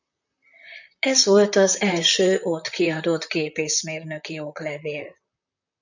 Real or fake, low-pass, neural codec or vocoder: fake; 7.2 kHz; vocoder, 44.1 kHz, 128 mel bands, Pupu-Vocoder